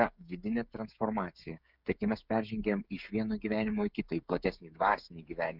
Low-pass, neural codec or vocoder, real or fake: 5.4 kHz; codec, 16 kHz, 16 kbps, FreqCodec, smaller model; fake